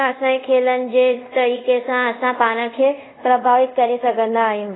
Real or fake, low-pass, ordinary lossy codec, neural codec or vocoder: fake; 7.2 kHz; AAC, 16 kbps; codec, 24 kHz, 0.5 kbps, DualCodec